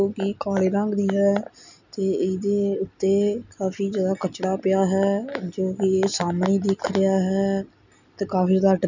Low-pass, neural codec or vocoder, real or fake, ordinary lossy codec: 7.2 kHz; none; real; none